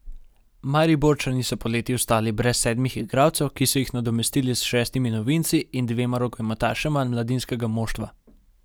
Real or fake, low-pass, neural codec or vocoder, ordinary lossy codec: real; none; none; none